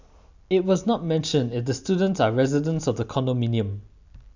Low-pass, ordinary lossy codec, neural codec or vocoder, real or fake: 7.2 kHz; none; none; real